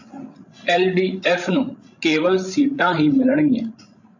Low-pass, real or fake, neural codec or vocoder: 7.2 kHz; fake; codec, 16 kHz, 16 kbps, FreqCodec, larger model